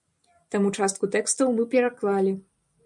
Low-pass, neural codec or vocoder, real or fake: 10.8 kHz; none; real